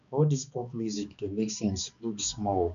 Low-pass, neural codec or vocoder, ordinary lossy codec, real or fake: 7.2 kHz; codec, 16 kHz, 2 kbps, X-Codec, HuBERT features, trained on balanced general audio; none; fake